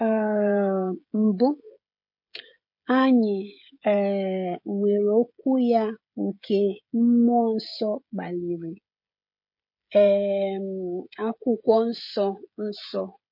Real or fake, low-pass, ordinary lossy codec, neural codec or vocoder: fake; 5.4 kHz; MP3, 32 kbps; codec, 16 kHz, 16 kbps, FreqCodec, smaller model